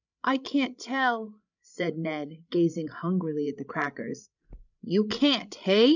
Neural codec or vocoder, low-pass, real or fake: codec, 16 kHz, 16 kbps, FreqCodec, larger model; 7.2 kHz; fake